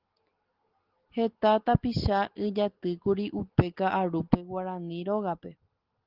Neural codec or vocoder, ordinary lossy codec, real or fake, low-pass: none; Opus, 16 kbps; real; 5.4 kHz